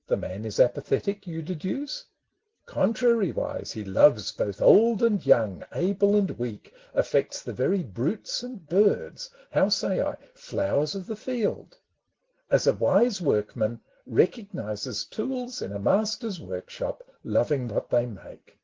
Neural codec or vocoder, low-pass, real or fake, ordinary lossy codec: none; 7.2 kHz; real; Opus, 16 kbps